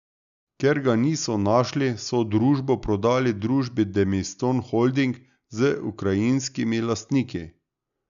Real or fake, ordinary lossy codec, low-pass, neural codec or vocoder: real; none; 7.2 kHz; none